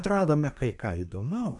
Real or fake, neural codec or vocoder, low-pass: fake; codec, 24 kHz, 1 kbps, SNAC; 10.8 kHz